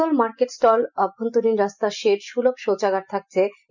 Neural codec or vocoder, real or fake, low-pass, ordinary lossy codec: none; real; 7.2 kHz; none